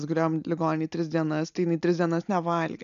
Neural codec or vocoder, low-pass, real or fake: none; 7.2 kHz; real